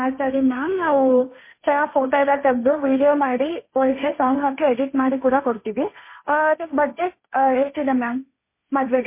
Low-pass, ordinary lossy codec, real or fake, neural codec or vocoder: 3.6 kHz; MP3, 24 kbps; fake; codec, 16 kHz, 1.1 kbps, Voila-Tokenizer